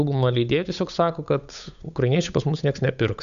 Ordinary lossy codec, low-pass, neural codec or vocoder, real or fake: AAC, 96 kbps; 7.2 kHz; codec, 16 kHz, 8 kbps, FunCodec, trained on Chinese and English, 25 frames a second; fake